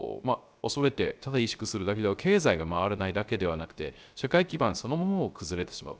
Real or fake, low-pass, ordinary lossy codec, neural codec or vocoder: fake; none; none; codec, 16 kHz, 0.3 kbps, FocalCodec